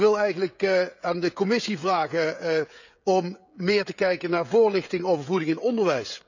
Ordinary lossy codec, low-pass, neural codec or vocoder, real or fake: none; 7.2 kHz; codec, 16 kHz, 16 kbps, FreqCodec, smaller model; fake